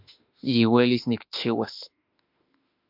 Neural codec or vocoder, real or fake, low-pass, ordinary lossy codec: autoencoder, 48 kHz, 32 numbers a frame, DAC-VAE, trained on Japanese speech; fake; 5.4 kHz; MP3, 48 kbps